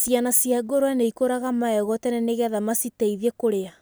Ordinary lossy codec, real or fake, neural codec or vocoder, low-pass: none; real; none; none